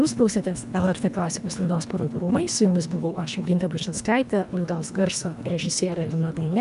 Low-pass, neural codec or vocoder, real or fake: 10.8 kHz; codec, 24 kHz, 1.5 kbps, HILCodec; fake